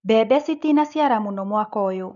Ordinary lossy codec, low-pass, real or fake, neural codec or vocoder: none; 7.2 kHz; real; none